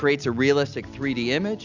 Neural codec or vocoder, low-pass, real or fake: none; 7.2 kHz; real